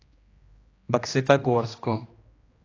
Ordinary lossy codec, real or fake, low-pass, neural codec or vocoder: AAC, 32 kbps; fake; 7.2 kHz; codec, 16 kHz, 1 kbps, X-Codec, HuBERT features, trained on general audio